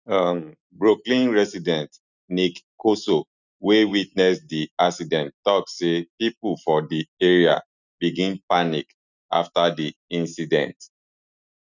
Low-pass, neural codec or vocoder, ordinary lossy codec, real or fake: 7.2 kHz; none; none; real